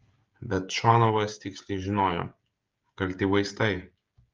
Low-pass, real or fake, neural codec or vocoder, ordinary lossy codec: 7.2 kHz; fake; codec, 16 kHz, 16 kbps, FreqCodec, smaller model; Opus, 32 kbps